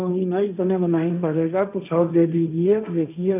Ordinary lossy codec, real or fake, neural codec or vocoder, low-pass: none; fake; codec, 16 kHz, 1.1 kbps, Voila-Tokenizer; 3.6 kHz